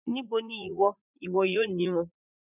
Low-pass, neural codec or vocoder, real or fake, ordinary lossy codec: 3.6 kHz; vocoder, 44.1 kHz, 80 mel bands, Vocos; fake; none